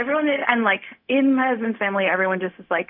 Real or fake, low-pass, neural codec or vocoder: fake; 5.4 kHz; codec, 16 kHz, 0.4 kbps, LongCat-Audio-Codec